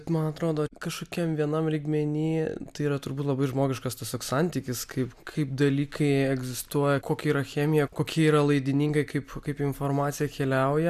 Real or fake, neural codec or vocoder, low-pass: real; none; 14.4 kHz